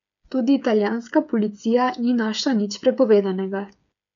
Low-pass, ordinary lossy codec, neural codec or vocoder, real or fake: 7.2 kHz; none; codec, 16 kHz, 8 kbps, FreqCodec, smaller model; fake